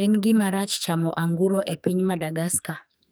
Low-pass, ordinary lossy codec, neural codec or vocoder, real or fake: none; none; codec, 44.1 kHz, 2.6 kbps, SNAC; fake